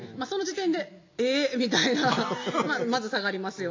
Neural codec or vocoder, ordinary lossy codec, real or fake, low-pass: none; MP3, 48 kbps; real; 7.2 kHz